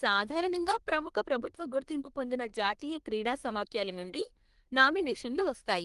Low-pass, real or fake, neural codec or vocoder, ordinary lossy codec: 10.8 kHz; fake; codec, 24 kHz, 1 kbps, SNAC; Opus, 24 kbps